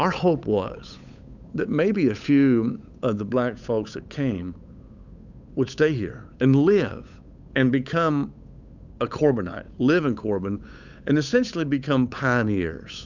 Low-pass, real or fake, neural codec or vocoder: 7.2 kHz; fake; codec, 16 kHz, 8 kbps, FunCodec, trained on Chinese and English, 25 frames a second